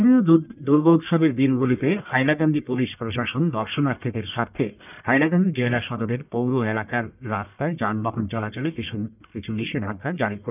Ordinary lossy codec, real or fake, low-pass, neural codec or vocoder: none; fake; 3.6 kHz; codec, 44.1 kHz, 1.7 kbps, Pupu-Codec